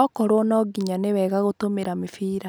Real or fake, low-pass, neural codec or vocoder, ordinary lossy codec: real; none; none; none